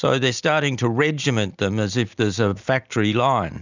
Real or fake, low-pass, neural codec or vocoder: real; 7.2 kHz; none